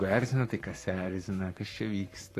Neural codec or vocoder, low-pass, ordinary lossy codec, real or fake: codec, 44.1 kHz, 7.8 kbps, Pupu-Codec; 14.4 kHz; AAC, 48 kbps; fake